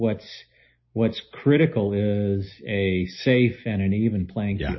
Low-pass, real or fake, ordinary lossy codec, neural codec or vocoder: 7.2 kHz; real; MP3, 24 kbps; none